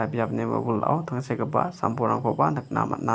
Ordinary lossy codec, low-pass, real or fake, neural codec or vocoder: none; none; real; none